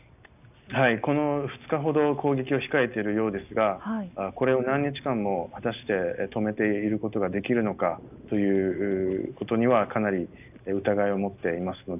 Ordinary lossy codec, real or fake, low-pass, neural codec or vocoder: none; real; 3.6 kHz; none